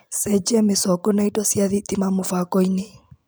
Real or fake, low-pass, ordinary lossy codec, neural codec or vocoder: real; none; none; none